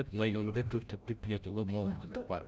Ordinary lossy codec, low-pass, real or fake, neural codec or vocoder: none; none; fake; codec, 16 kHz, 0.5 kbps, FreqCodec, larger model